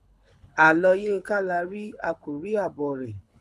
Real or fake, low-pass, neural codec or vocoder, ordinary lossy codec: fake; none; codec, 24 kHz, 6 kbps, HILCodec; none